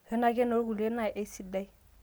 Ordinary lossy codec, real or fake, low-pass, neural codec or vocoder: none; real; none; none